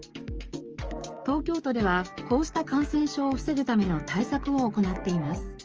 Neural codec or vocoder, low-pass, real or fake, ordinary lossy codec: codec, 44.1 kHz, 7.8 kbps, DAC; 7.2 kHz; fake; Opus, 24 kbps